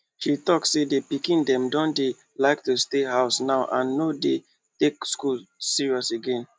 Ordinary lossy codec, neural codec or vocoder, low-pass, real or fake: none; none; none; real